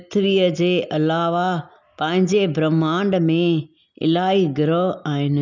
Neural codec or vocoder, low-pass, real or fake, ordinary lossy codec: none; 7.2 kHz; real; none